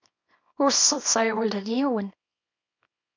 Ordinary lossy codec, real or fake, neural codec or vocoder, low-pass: MP3, 48 kbps; fake; codec, 24 kHz, 0.9 kbps, WavTokenizer, small release; 7.2 kHz